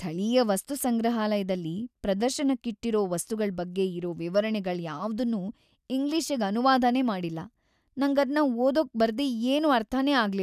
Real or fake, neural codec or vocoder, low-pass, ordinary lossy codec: real; none; 14.4 kHz; none